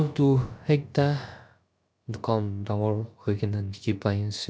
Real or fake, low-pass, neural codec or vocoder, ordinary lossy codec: fake; none; codec, 16 kHz, about 1 kbps, DyCAST, with the encoder's durations; none